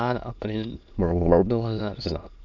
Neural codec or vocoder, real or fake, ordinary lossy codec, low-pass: autoencoder, 22.05 kHz, a latent of 192 numbers a frame, VITS, trained on many speakers; fake; none; 7.2 kHz